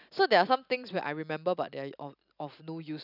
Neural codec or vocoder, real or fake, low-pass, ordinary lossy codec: none; real; 5.4 kHz; none